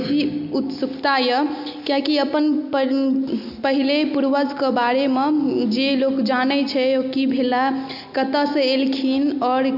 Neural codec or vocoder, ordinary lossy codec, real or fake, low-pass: none; none; real; 5.4 kHz